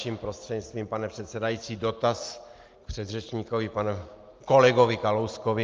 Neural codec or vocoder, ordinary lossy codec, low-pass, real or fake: none; Opus, 32 kbps; 7.2 kHz; real